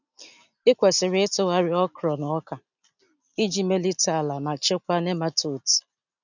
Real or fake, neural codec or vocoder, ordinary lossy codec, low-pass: real; none; none; 7.2 kHz